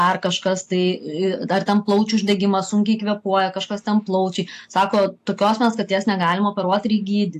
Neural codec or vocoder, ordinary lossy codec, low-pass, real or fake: none; AAC, 64 kbps; 14.4 kHz; real